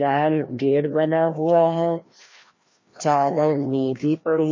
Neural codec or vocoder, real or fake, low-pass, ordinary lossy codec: codec, 16 kHz, 1 kbps, FreqCodec, larger model; fake; 7.2 kHz; MP3, 32 kbps